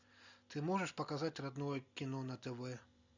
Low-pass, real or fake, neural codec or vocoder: 7.2 kHz; real; none